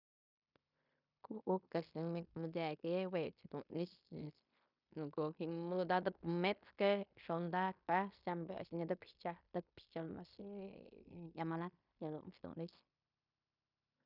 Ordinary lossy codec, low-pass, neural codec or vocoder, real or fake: none; 5.4 kHz; codec, 16 kHz in and 24 kHz out, 0.9 kbps, LongCat-Audio-Codec, fine tuned four codebook decoder; fake